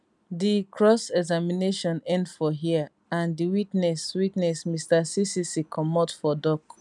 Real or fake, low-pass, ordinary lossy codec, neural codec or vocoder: real; 10.8 kHz; none; none